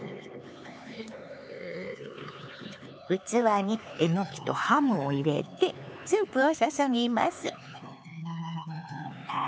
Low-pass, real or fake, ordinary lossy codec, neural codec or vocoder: none; fake; none; codec, 16 kHz, 4 kbps, X-Codec, HuBERT features, trained on LibriSpeech